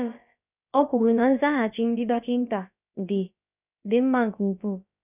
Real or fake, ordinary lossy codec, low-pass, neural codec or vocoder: fake; none; 3.6 kHz; codec, 16 kHz, about 1 kbps, DyCAST, with the encoder's durations